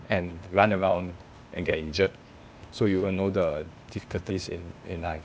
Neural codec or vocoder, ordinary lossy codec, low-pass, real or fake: codec, 16 kHz, 0.8 kbps, ZipCodec; none; none; fake